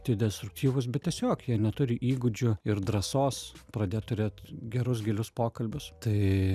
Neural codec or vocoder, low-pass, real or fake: none; 14.4 kHz; real